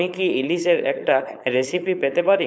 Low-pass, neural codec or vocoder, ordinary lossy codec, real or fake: none; codec, 16 kHz, 4.8 kbps, FACodec; none; fake